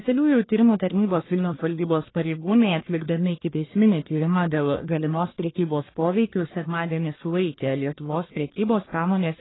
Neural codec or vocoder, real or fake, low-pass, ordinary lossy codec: codec, 44.1 kHz, 1.7 kbps, Pupu-Codec; fake; 7.2 kHz; AAC, 16 kbps